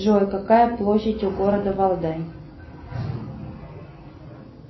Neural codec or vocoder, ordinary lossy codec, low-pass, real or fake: none; MP3, 24 kbps; 7.2 kHz; real